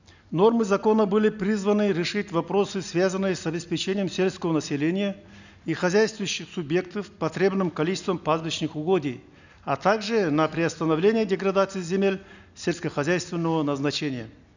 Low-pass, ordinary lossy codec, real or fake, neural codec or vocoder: 7.2 kHz; none; real; none